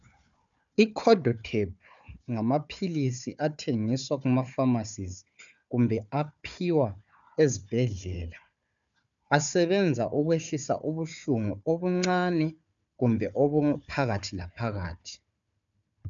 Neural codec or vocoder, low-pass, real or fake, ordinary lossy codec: codec, 16 kHz, 4 kbps, FunCodec, trained on Chinese and English, 50 frames a second; 7.2 kHz; fake; MP3, 96 kbps